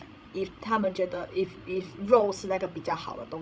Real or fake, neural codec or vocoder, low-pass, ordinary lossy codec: fake; codec, 16 kHz, 16 kbps, FreqCodec, larger model; none; none